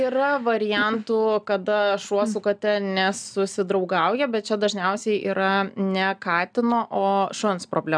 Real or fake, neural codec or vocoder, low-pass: real; none; 9.9 kHz